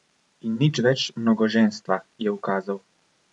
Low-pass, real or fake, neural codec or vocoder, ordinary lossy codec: 10.8 kHz; fake; vocoder, 44.1 kHz, 128 mel bands every 512 samples, BigVGAN v2; none